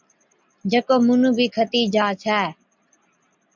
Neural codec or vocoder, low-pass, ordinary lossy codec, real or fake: none; 7.2 kHz; MP3, 64 kbps; real